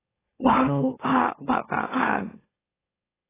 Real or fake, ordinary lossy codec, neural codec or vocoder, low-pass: fake; AAC, 16 kbps; autoencoder, 44.1 kHz, a latent of 192 numbers a frame, MeloTTS; 3.6 kHz